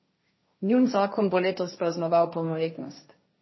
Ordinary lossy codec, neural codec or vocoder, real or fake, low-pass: MP3, 24 kbps; codec, 16 kHz, 1.1 kbps, Voila-Tokenizer; fake; 7.2 kHz